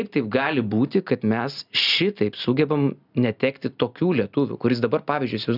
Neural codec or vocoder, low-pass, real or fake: none; 5.4 kHz; real